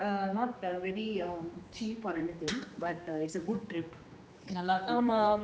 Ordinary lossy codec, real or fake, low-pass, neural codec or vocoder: none; fake; none; codec, 16 kHz, 2 kbps, X-Codec, HuBERT features, trained on general audio